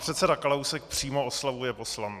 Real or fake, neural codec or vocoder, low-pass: real; none; 14.4 kHz